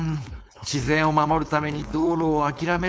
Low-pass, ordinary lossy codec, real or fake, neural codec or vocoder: none; none; fake; codec, 16 kHz, 4.8 kbps, FACodec